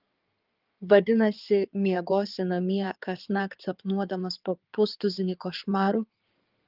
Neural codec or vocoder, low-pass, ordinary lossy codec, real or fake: codec, 16 kHz in and 24 kHz out, 2.2 kbps, FireRedTTS-2 codec; 5.4 kHz; Opus, 32 kbps; fake